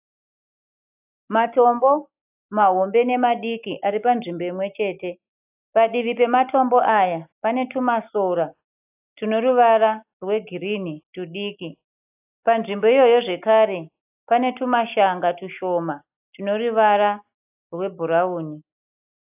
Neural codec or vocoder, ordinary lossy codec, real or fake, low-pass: none; AAC, 32 kbps; real; 3.6 kHz